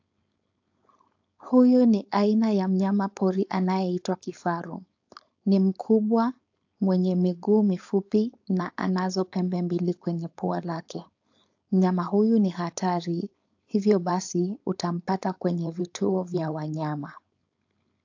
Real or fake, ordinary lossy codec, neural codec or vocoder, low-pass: fake; AAC, 48 kbps; codec, 16 kHz, 4.8 kbps, FACodec; 7.2 kHz